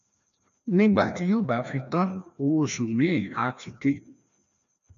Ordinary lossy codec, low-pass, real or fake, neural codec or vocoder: none; 7.2 kHz; fake; codec, 16 kHz, 1 kbps, FreqCodec, larger model